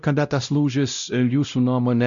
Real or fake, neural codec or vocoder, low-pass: fake; codec, 16 kHz, 0.5 kbps, X-Codec, WavLM features, trained on Multilingual LibriSpeech; 7.2 kHz